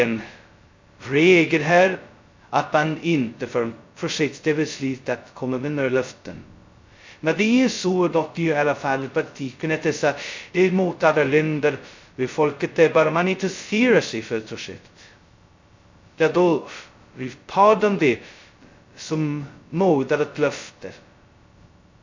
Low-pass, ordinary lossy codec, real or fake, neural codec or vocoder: 7.2 kHz; MP3, 64 kbps; fake; codec, 16 kHz, 0.2 kbps, FocalCodec